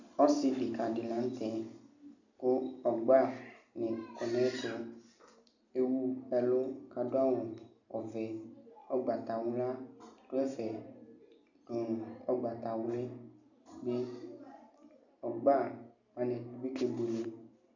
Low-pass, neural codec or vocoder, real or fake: 7.2 kHz; none; real